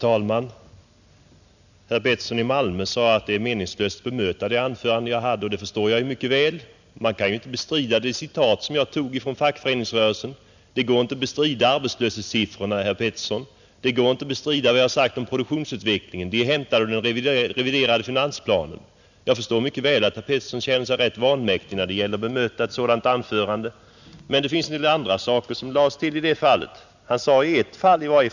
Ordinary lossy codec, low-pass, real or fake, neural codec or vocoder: none; 7.2 kHz; real; none